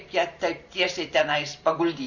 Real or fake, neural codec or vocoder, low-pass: fake; vocoder, 44.1 kHz, 128 mel bands every 512 samples, BigVGAN v2; 7.2 kHz